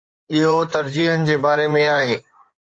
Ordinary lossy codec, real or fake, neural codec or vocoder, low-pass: AAC, 48 kbps; fake; codec, 16 kHz in and 24 kHz out, 2.2 kbps, FireRedTTS-2 codec; 9.9 kHz